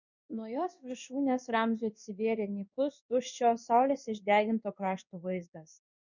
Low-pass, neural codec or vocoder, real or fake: 7.2 kHz; codec, 16 kHz in and 24 kHz out, 1 kbps, XY-Tokenizer; fake